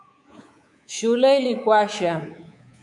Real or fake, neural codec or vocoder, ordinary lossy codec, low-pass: fake; codec, 24 kHz, 3.1 kbps, DualCodec; MP3, 64 kbps; 10.8 kHz